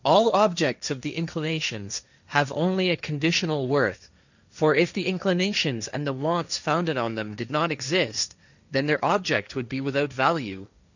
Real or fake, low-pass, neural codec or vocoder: fake; 7.2 kHz; codec, 16 kHz, 1.1 kbps, Voila-Tokenizer